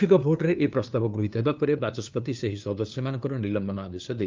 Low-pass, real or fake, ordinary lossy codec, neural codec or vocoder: 7.2 kHz; fake; Opus, 32 kbps; codec, 16 kHz, 2 kbps, FunCodec, trained on LibriTTS, 25 frames a second